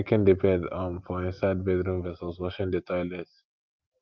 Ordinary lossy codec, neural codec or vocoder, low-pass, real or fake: Opus, 24 kbps; none; 7.2 kHz; real